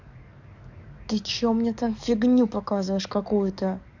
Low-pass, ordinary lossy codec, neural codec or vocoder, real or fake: 7.2 kHz; none; codec, 44.1 kHz, 7.8 kbps, DAC; fake